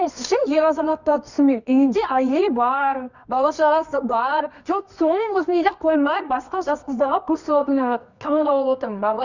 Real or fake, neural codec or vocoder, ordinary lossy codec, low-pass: fake; codec, 24 kHz, 0.9 kbps, WavTokenizer, medium music audio release; none; 7.2 kHz